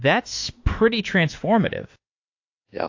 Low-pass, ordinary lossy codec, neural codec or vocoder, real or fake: 7.2 kHz; AAC, 48 kbps; autoencoder, 48 kHz, 32 numbers a frame, DAC-VAE, trained on Japanese speech; fake